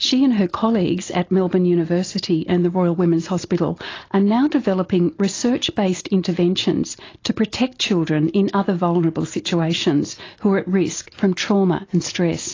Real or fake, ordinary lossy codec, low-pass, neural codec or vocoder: real; AAC, 32 kbps; 7.2 kHz; none